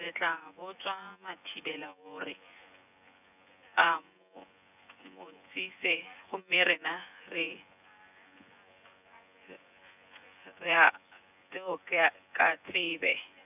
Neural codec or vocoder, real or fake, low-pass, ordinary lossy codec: vocoder, 24 kHz, 100 mel bands, Vocos; fake; 3.6 kHz; none